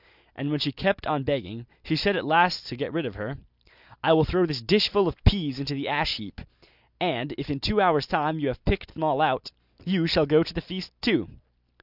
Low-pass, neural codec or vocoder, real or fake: 5.4 kHz; none; real